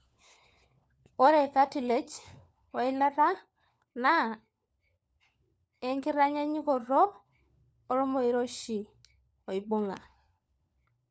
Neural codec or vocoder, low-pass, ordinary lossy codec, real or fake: codec, 16 kHz, 4 kbps, FunCodec, trained on LibriTTS, 50 frames a second; none; none; fake